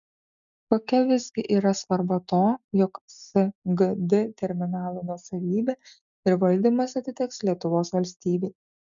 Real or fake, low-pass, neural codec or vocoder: real; 7.2 kHz; none